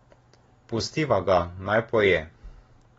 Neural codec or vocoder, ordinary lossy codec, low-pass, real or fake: none; AAC, 24 kbps; 19.8 kHz; real